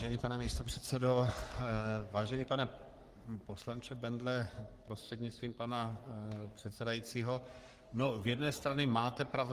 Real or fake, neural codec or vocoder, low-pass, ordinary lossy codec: fake; codec, 44.1 kHz, 3.4 kbps, Pupu-Codec; 14.4 kHz; Opus, 24 kbps